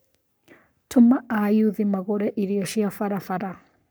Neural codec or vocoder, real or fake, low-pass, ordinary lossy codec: codec, 44.1 kHz, 7.8 kbps, Pupu-Codec; fake; none; none